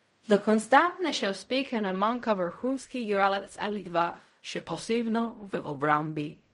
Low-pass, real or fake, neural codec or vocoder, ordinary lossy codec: 10.8 kHz; fake; codec, 16 kHz in and 24 kHz out, 0.4 kbps, LongCat-Audio-Codec, fine tuned four codebook decoder; MP3, 48 kbps